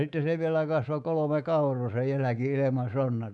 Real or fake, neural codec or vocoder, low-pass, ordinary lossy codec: fake; codec, 24 kHz, 3.1 kbps, DualCodec; 10.8 kHz; none